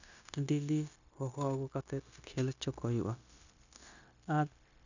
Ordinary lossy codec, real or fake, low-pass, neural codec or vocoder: none; fake; 7.2 kHz; codec, 16 kHz, 0.9 kbps, LongCat-Audio-Codec